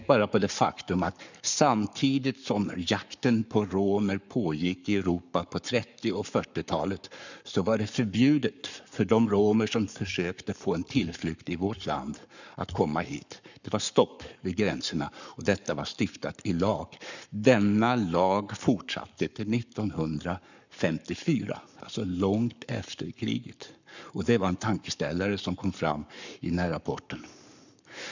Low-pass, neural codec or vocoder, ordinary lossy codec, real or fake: 7.2 kHz; codec, 44.1 kHz, 7.8 kbps, Pupu-Codec; none; fake